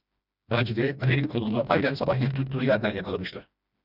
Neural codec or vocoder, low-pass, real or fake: codec, 16 kHz, 1 kbps, FreqCodec, smaller model; 5.4 kHz; fake